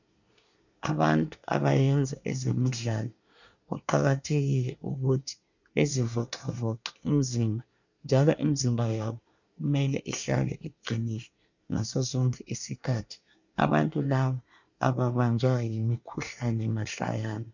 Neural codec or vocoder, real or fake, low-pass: codec, 24 kHz, 1 kbps, SNAC; fake; 7.2 kHz